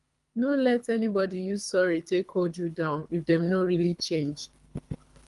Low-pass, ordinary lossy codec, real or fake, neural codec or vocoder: 10.8 kHz; Opus, 32 kbps; fake; codec, 24 kHz, 3 kbps, HILCodec